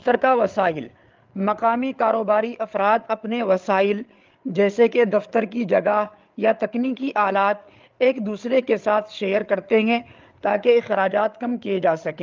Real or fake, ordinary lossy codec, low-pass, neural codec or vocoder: fake; Opus, 32 kbps; 7.2 kHz; codec, 16 kHz, 8 kbps, FreqCodec, larger model